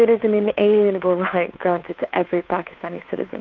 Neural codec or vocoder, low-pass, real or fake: codec, 16 kHz, 2 kbps, FunCodec, trained on Chinese and English, 25 frames a second; 7.2 kHz; fake